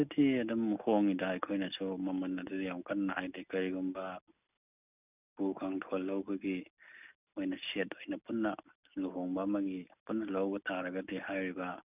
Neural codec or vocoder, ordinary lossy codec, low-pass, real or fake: none; none; 3.6 kHz; real